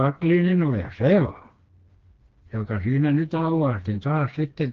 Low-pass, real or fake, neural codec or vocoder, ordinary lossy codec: 7.2 kHz; fake; codec, 16 kHz, 2 kbps, FreqCodec, smaller model; Opus, 16 kbps